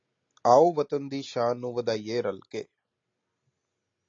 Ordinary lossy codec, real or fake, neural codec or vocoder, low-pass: AAC, 48 kbps; real; none; 7.2 kHz